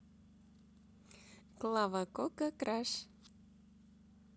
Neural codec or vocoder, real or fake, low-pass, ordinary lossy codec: none; real; none; none